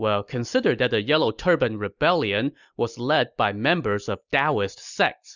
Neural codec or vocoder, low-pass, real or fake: none; 7.2 kHz; real